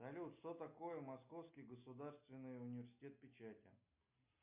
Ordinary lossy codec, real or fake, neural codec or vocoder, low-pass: MP3, 32 kbps; real; none; 3.6 kHz